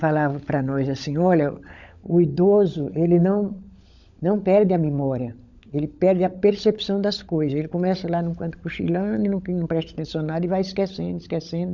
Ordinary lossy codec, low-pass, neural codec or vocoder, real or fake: none; 7.2 kHz; codec, 16 kHz, 16 kbps, FunCodec, trained on LibriTTS, 50 frames a second; fake